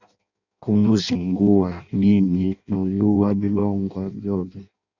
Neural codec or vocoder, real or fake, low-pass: codec, 16 kHz in and 24 kHz out, 0.6 kbps, FireRedTTS-2 codec; fake; 7.2 kHz